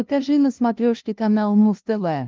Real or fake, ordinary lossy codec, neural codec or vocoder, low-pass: fake; Opus, 32 kbps; codec, 16 kHz, 0.5 kbps, FunCodec, trained on LibriTTS, 25 frames a second; 7.2 kHz